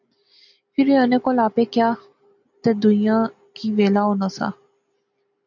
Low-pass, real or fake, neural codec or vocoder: 7.2 kHz; real; none